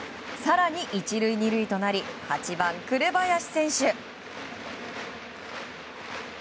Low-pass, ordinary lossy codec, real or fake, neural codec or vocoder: none; none; real; none